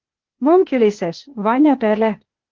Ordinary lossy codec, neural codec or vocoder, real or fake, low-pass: Opus, 16 kbps; codec, 16 kHz, 0.8 kbps, ZipCodec; fake; 7.2 kHz